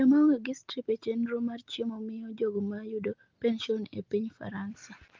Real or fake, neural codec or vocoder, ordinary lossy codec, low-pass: real; none; Opus, 32 kbps; 7.2 kHz